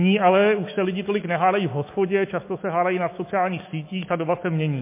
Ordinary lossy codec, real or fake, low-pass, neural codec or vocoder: MP3, 24 kbps; fake; 3.6 kHz; autoencoder, 48 kHz, 128 numbers a frame, DAC-VAE, trained on Japanese speech